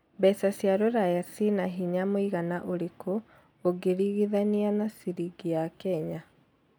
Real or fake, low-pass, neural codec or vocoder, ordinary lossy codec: real; none; none; none